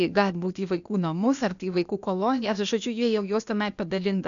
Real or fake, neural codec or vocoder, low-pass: fake; codec, 16 kHz, 0.8 kbps, ZipCodec; 7.2 kHz